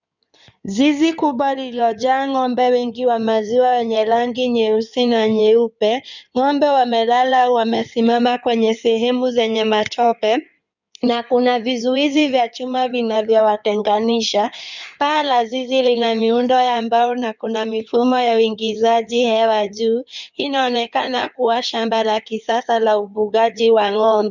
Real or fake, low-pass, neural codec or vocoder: fake; 7.2 kHz; codec, 16 kHz in and 24 kHz out, 2.2 kbps, FireRedTTS-2 codec